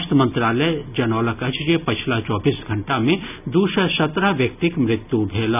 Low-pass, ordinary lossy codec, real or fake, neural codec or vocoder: 3.6 kHz; none; real; none